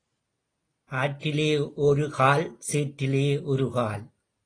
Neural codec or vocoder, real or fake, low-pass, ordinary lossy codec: none; real; 9.9 kHz; AAC, 32 kbps